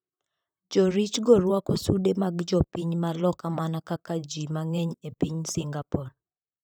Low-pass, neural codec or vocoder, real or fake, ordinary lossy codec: none; vocoder, 44.1 kHz, 128 mel bands, Pupu-Vocoder; fake; none